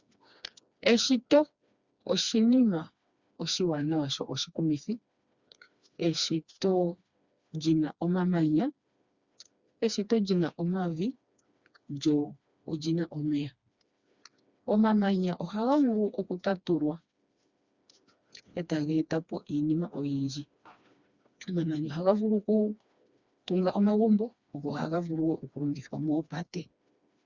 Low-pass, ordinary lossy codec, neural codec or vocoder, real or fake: 7.2 kHz; Opus, 64 kbps; codec, 16 kHz, 2 kbps, FreqCodec, smaller model; fake